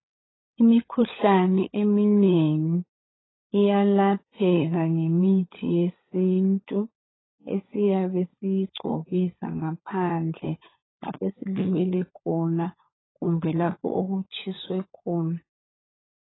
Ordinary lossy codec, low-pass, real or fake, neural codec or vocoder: AAC, 16 kbps; 7.2 kHz; fake; codec, 16 kHz, 16 kbps, FunCodec, trained on LibriTTS, 50 frames a second